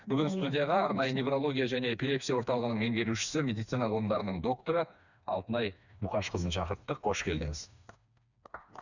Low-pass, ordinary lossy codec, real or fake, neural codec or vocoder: 7.2 kHz; none; fake; codec, 16 kHz, 2 kbps, FreqCodec, smaller model